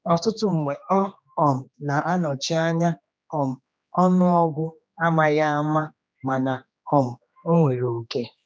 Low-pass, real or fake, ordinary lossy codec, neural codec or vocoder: none; fake; none; codec, 16 kHz, 2 kbps, X-Codec, HuBERT features, trained on general audio